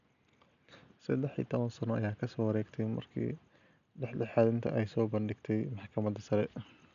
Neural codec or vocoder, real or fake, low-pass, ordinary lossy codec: none; real; 7.2 kHz; MP3, 96 kbps